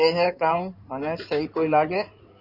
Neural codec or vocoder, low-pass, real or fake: codec, 16 kHz in and 24 kHz out, 2.2 kbps, FireRedTTS-2 codec; 5.4 kHz; fake